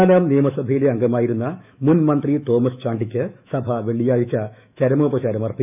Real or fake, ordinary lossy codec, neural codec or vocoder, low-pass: fake; none; autoencoder, 48 kHz, 128 numbers a frame, DAC-VAE, trained on Japanese speech; 3.6 kHz